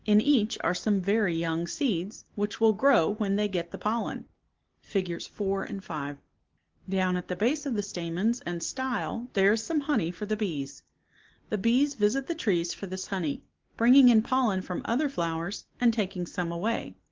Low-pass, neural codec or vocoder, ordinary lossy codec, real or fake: 7.2 kHz; none; Opus, 16 kbps; real